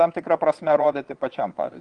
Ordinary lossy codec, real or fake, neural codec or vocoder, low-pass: Opus, 24 kbps; fake; vocoder, 22.05 kHz, 80 mel bands, Vocos; 9.9 kHz